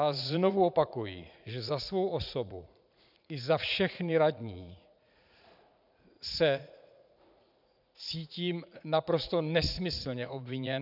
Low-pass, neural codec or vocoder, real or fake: 5.4 kHz; vocoder, 44.1 kHz, 80 mel bands, Vocos; fake